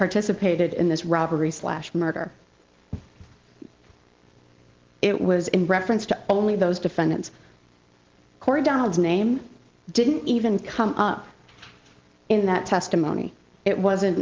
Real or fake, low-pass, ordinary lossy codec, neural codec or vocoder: real; 7.2 kHz; Opus, 16 kbps; none